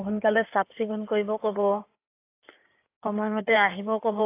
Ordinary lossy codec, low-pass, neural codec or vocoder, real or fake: AAC, 24 kbps; 3.6 kHz; codec, 16 kHz in and 24 kHz out, 2.2 kbps, FireRedTTS-2 codec; fake